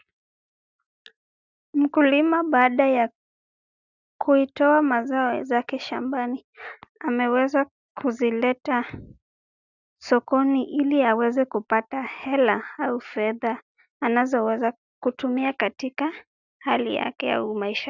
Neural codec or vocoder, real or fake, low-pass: none; real; 7.2 kHz